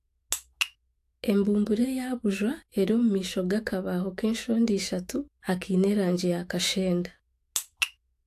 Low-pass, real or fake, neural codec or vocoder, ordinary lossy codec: 14.4 kHz; fake; autoencoder, 48 kHz, 128 numbers a frame, DAC-VAE, trained on Japanese speech; AAC, 64 kbps